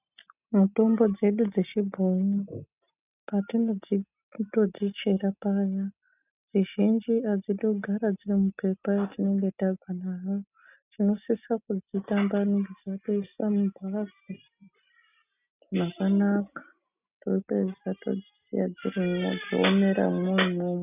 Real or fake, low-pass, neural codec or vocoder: real; 3.6 kHz; none